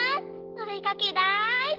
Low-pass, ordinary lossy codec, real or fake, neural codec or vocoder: 5.4 kHz; Opus, 16 kbps; real; none